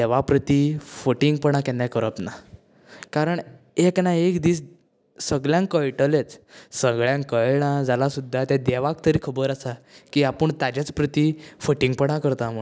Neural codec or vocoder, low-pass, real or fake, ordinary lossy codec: none; none; real; none